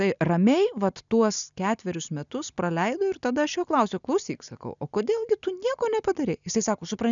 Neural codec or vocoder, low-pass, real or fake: none; 7.2 kHz; real